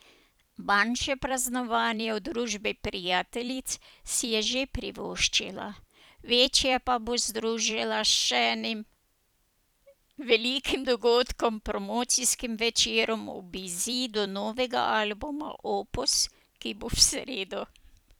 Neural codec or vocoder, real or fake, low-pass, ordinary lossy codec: vocoder, 44.1 kHz, 128 mel bands every 256 samples, BigVGAN v2; fake; none; none